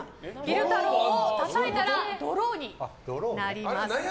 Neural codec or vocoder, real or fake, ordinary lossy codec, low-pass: none; real; none; none